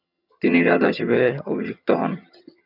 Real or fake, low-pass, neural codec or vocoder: fake; 5.4 kHz; vocoder, 22.05 kHz, 80 mel bands, HiFi-GAN